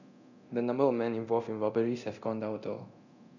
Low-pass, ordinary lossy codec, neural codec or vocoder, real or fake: 7.2 kHz; none; codec, 24 kHz, 0.9 kbps, DualCodec; fake